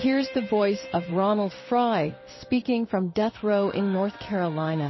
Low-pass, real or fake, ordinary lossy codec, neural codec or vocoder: 7.2 kHz; fake; MP3, 24 kbps; codec, 16 kHz in and 24 kHz out, 1 kbps, XY-Tokenizer